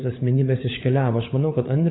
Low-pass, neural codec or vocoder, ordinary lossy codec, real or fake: 7.2 kHz; codec, 16 kHz, 16 kbps, FunCodec, trained on LibriTTS, 50 frames a second; AAC, 16 kbps; fake